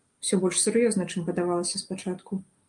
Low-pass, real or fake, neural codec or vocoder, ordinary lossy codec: 10.8 kHz; real; none; Opus, 32 kbps